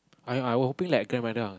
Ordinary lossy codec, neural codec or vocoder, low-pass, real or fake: none; none; none; real